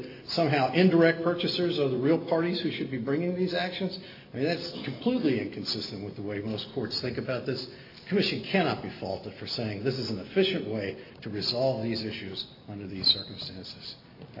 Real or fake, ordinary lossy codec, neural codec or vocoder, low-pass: real; AAC, 32 kbps; none; 5.4 kHz